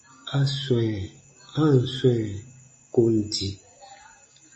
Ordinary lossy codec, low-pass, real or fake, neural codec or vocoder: MP3, 32 kbps; 9.9 kHz; real; none